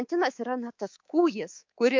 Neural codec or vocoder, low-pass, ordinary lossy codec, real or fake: codec, 24 kHz, 3.1 kbps, DualCodec; 7.2 kHz; MP3, 48 kbps; fake